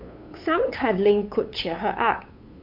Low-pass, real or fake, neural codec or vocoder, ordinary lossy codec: 5.4 kHz; fake; codec, 16 kHz, 8 kbps, FunCodec, trained on LibriTTS, 25 frames a second; MP3, 48 kbps